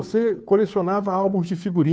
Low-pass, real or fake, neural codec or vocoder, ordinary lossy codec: none; fake; codec, 16 kHz, 2 kbps, FunCodec, trained on Chinese and English, 25 frames a second; none